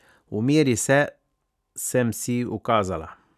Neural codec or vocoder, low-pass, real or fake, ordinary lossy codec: none; 14.4 kHz; real; none